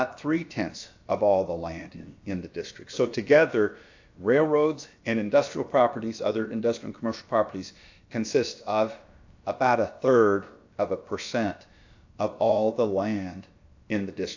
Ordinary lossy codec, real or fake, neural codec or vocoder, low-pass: AAC, 48 kbps; fake; codec, 16 kHz, about 1 kbps, DyCAST, with the encoder's durations; 7.2 kHz